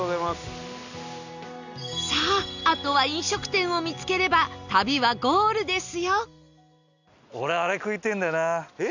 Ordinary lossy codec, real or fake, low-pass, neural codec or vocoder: none; real; 7.2 kHz; none